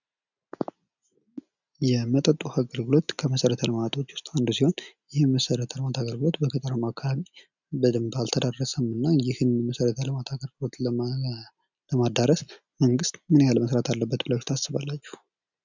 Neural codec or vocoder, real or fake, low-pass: none; real; 7.2 kHz